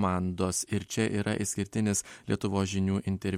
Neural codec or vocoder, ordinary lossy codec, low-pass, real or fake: none; MP3, 64 kbps; 19.8 kHz; real